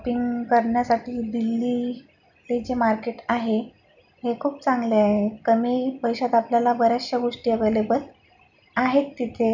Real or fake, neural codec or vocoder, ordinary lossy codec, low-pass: real; none; none; 7.2 kHz